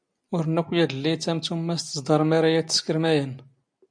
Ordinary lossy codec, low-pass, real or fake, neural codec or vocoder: MP3, 64 kbps; 9.9 kHz; real; none